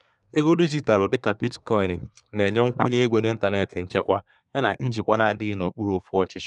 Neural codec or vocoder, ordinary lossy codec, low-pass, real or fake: codec, 24 kHz, 1 kbps, SNAC; none; 10.8 kHz; fake